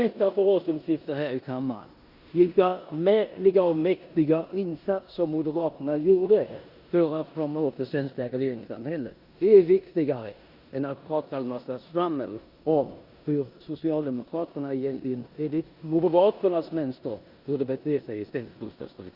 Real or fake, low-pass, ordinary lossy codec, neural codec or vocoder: fake; 5.4 kHz; Opus, 64 kbps; codec, 16 kHz in and 24 kHz out, 0.9 kbps, LongCat-Audio-Codec, four codebook decoder